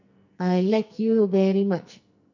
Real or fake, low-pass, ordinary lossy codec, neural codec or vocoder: fake; 7.2 kHz; none; codec, 44.1 kHz, 2.6 kbps, SNAC